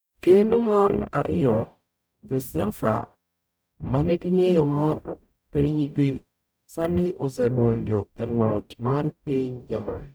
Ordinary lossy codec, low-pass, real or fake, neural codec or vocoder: none; none; fake; codec, 44.1 kHz, 0.9 kbps, DAC